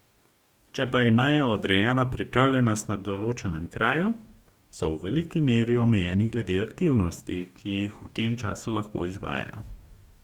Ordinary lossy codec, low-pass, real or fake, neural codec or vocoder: Opus, 64 kbps; 19.8 kHz; fake; codec, 44.1 kHz, 2.6 kbps, DAC